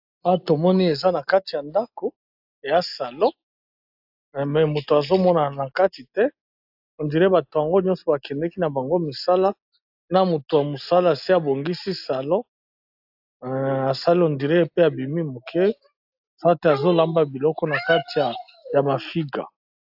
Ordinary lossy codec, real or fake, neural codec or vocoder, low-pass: AAC, 48 kbps; real; none; 5.4 kHz